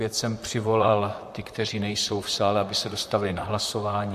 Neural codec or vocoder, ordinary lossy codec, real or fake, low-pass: vocoder, 44.1 kHz, 128 mel bands, Pupu-Vocoder; MP3, 64 kbps; fake; 14.4 kHz